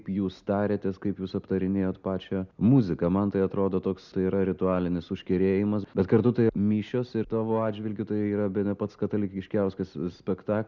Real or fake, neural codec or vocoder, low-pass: real; none; 7.2 kHz